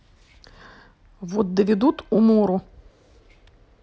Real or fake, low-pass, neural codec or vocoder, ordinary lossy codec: real; none; none; none